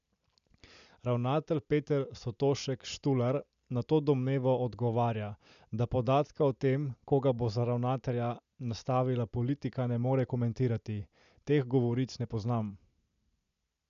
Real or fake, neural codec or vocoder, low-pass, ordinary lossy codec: real; none; 7.2 kHz; MP3, 96 kbps